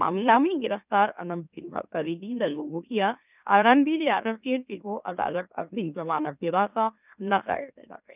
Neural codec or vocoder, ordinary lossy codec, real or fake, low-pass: autoencoder, 44.1 kHz, a latent of 192 numbers a frame, MeloTTS; none; fake; 3.6 kHz